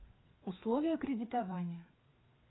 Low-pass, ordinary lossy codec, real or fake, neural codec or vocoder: 7.2 kHz; AAC, 16 kbps; fake; codec, 16 kHz, 4 kbps, FreqCodec, larger model